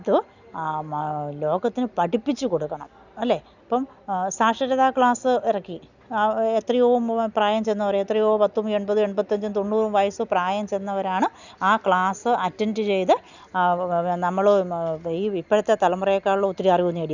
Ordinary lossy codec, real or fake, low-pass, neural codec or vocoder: none; real; 7.2 kHz; none